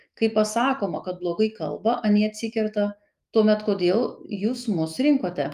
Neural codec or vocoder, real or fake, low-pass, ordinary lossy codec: autoencoder, 48 kHz, 128 numbers a frame, DAC-VAE, trained on Japanese speech; fake; 14.4 kHz; Opus, 32 kbps